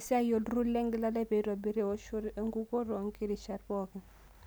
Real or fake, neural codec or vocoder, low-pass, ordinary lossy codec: real; none; none; none